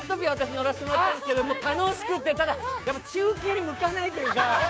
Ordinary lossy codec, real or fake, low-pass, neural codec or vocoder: none; fake; none; codec, 16 kHz, 6 kbps, DAC